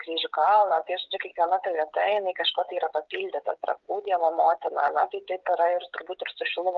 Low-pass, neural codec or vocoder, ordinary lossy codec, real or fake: 7.2 kHz; codec, 16 kHz, 16 kbps, FreqCodec, smaller model; Opus, 32 kbps; fake